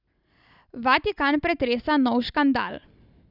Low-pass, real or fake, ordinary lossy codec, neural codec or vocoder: 5.4 kHz; real; none; none